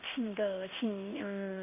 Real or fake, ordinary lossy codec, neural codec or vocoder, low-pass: fake; none; codec, 16 kHz in and 24 kHz out, 1 kbps, XY-Tokenizer; 3.6 kHz